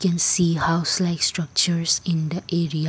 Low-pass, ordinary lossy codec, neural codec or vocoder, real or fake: none; none; none; real